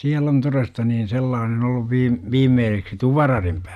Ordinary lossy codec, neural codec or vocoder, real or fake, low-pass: none; none; real; 14.4 kHz